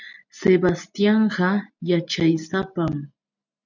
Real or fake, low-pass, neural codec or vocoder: real; 7.2 kHz; none